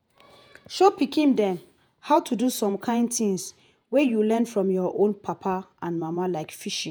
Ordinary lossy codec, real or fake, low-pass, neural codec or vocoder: none; fake; none; vocoder, 48 kHz, 128 mel bands, Vocos